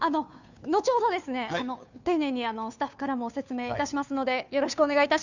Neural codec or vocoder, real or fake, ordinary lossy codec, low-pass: codec, 16 kHz, 2 kbps, FunCodec, trained on Chinese and English, 25 frames a second; fake; none; 7.2 kHz